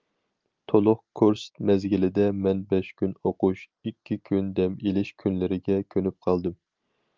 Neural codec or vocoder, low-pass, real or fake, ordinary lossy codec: none; 7.2 kHz; real; Opus, 24 kbps